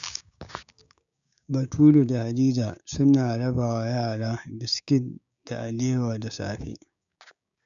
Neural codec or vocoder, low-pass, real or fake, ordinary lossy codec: codec, 16 kHz, 6 kbps, DAC; 7.2 kHz; fake; none